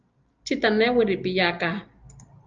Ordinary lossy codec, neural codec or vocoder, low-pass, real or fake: Opus, 24 kbps; none; 7.2 kHz; real